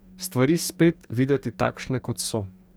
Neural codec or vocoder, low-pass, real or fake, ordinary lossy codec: codec, 44.1 kHz, 2.6 kbps, DAC; none; fake; none